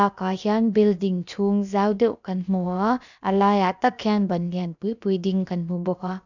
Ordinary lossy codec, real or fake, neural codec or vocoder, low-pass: none; fake; codec, 16 kHz, about 1 kbps, DyCAST, with the encoder's durations; 7.2 kHz